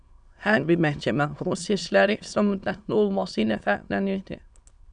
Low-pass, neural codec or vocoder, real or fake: 9.9 kHz; autoencoder, 22.05 kHz, a latent of 192 numbers a frame, VITS, trained on many speakers; fake